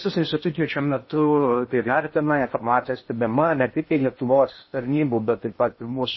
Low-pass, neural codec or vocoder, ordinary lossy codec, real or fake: 7.2 kHz; codec, 16 kHz in and 24 kHz out, 0.6 kbps, FocalCodec, streaming, 2048 codes; MP3, 24 kbps; fake